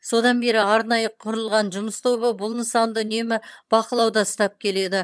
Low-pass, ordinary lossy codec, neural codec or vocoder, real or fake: none; none; vocoder, 22.05 kHz, 80 mel bands, HiFi-GAN; fake